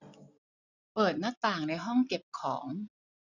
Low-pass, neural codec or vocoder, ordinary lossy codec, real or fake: 7.2 kHz; none; none; real